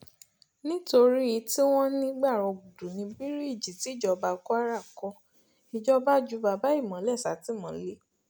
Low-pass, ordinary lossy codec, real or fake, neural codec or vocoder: none; none; real; none